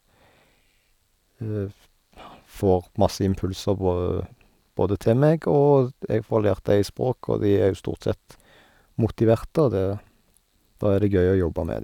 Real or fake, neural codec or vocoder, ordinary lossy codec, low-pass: real; none; none; 19.8 kHz